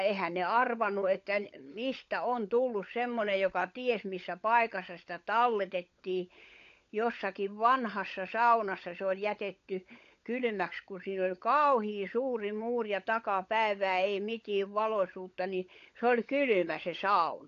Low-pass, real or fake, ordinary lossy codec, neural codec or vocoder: 7.2 kHz; fake; AAC, 48 kbps; codec, 16 kHz, 16 kbps, FunCodec, trained on LibriTTS, 50 frames a second